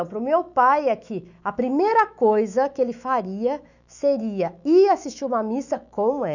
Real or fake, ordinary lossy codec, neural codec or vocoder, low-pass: fake; none; autoencoder, 48 kHz, 128 numbers a frame, DAC-VAE, trained on Japanese speech; 7.2 kHz